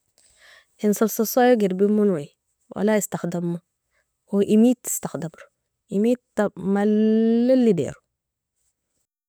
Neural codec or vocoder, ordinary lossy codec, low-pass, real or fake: none; none; none; real